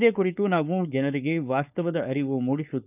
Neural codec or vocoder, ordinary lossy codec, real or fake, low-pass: codec, 16 kHz, 4.8 kbps, FACodec; none; fake; 3.6 kHz